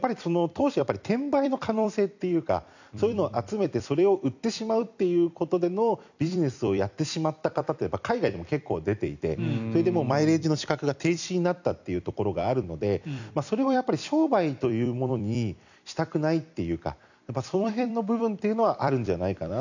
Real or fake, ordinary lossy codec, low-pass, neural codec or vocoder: fake; none; 7.2 kHz; vocoder, 44.1 kHz, 128 mel bands every 256 samples, BigVGAN v2